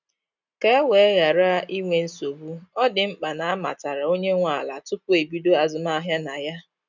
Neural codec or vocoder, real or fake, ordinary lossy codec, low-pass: none; real; none; 7.2 kHz